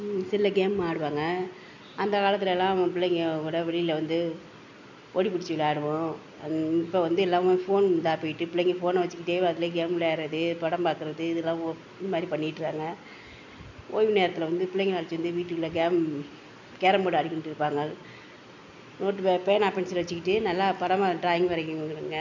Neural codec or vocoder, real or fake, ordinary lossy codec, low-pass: none; real; none; 7.2 kHz